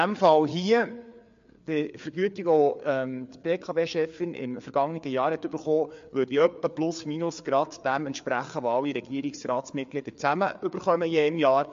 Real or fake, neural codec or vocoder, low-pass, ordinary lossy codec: fake; codec, 16 kHz, 4 kbps, FreqCodec, larger model; 7.2 kHz; MP3, 48 kbps